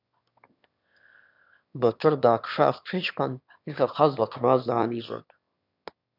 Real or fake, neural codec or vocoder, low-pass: fake; autoencoder, 22.05 kHz, a latent of 192 numbers a frame, VITS, trained on one speaker; 5.4 kHz